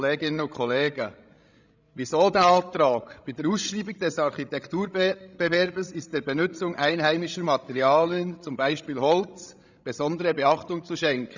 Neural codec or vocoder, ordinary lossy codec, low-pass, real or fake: codec, 16 kHz, 16 kbps, FreqCodec, larger model; none; 7.2 kHz; fake